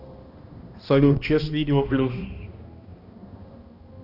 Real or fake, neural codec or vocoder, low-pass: fake; codec, 16 kHz, 1 kbps, X-Codec, HuBERT features, trained on balanced general audio; 5.4 kHz